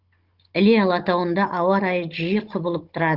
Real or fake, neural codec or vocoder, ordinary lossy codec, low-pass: fake; codec, 16 kHz, 16 kbps, FunCodec, trained on Chinese and English, 50 frames a second; Opus, 16 kbps; 5.4 kHz